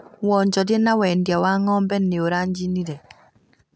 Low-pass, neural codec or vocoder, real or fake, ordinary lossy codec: none; none; real; none